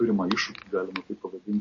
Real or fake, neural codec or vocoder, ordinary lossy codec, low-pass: real; none; MP3, 32 kbps; 7.2 kHz